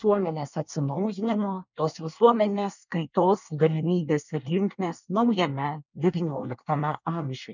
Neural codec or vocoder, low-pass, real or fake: codec, 24 kHz, 1 kbps, SNAC; 7.2 kHz; fake